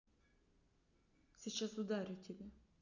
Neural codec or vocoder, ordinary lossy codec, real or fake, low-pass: none; none; real; 7.2 kHz